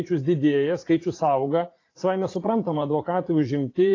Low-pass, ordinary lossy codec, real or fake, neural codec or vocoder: 7.2 kHz; AAC, 32 kbps; fake; vocoder, 44.1 kHz, 80 mel bands, Vocos